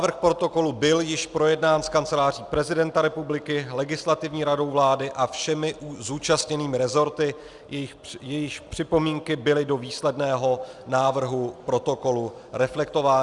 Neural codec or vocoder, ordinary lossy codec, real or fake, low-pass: none; Opus, 64 kbps; real; 10.8 kHz